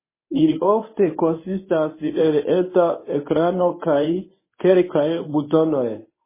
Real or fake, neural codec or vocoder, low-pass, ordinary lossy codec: fake; codec, 24 kHz, 0.9 kbps, WavTokenizer, medium speech release version 1; 3.6 kHz; MP3, 16 kbps